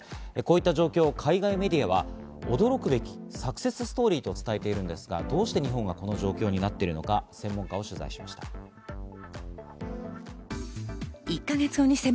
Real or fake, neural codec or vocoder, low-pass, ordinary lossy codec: real; none; none; none